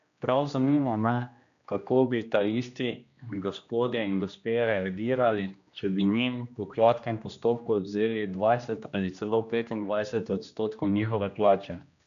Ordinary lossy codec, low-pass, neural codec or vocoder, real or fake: AAC, 96 kbps; 7.2 kHz; codec, 16 kHz, 1 kbps, X-Codec, HuBERT features, trained on general audio; fake